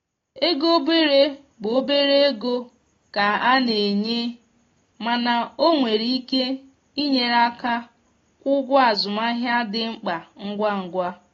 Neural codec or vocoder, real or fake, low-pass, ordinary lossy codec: none; real; 7.2 kHz; AAC, 32 kbps